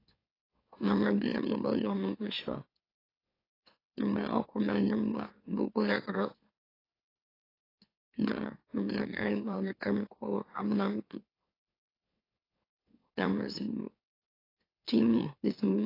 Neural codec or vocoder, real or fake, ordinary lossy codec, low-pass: autoencoder, 44.1 kHz, a latent of 192 numbers a frame, MeloTTS; fake; AAC, 24 kbps; 5.4 kHz